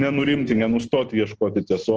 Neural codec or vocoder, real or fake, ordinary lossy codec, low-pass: none; real; Opus, 16 kbps; 7.2 kHz